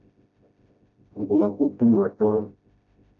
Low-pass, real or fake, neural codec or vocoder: 7.2 kHz; fake; codec, 16 kHz, 0.5 kbps, FreqCodec, smaller model